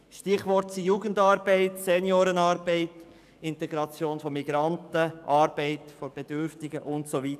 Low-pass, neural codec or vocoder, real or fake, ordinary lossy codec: 14.4 kHz; codec, 44.1 kHz, 7.8 kbps, DAC; fake; none